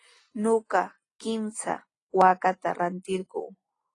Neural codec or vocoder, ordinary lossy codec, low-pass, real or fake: none; AAC, 32 kbps; 10.8 kHz; real